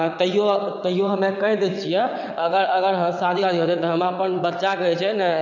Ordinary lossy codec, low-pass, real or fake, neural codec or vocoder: none; 7.2 kHz; fake; vocoder, 22.05 kHz, 80 mel bands, WaveNeXt